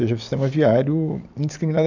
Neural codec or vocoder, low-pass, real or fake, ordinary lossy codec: none; 7.2 kHz; real; none